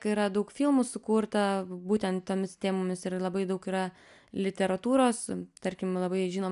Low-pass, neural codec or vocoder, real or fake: 10.8 kHz; none; real